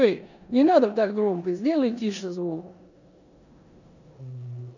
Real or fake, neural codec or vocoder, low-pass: fake; codec, 16 kHz in and 24 kHz out, 0.9 kbps, LongCat-Audio-Codec, four codebook decoder; 7.2 kHz